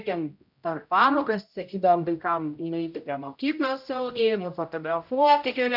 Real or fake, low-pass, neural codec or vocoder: fake; 5.4 kHz; codec, 16 kHz, 0.5 kbps, X-Codec, HuBERT features, trained on general audio